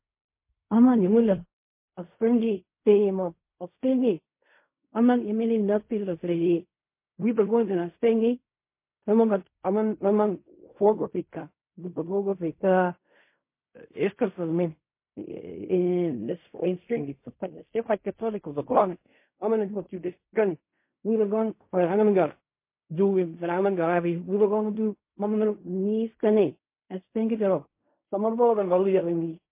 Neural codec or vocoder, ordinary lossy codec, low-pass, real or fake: codec, 16 kHz in and 24 kHz out, 0.4 kbps, LongCat-Audio-Codec, fine tuned four codebook decoder; MP3, 24 kbps; 3.6 kHz; fake